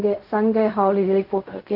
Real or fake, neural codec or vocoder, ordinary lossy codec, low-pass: fake; codec, 16 kHz in and 24 kHz out, 0.4 kbps, LongCat-Audio-Codec, fine tuned four codebook decoder; AAC, 48 kbps; 5.4 kHz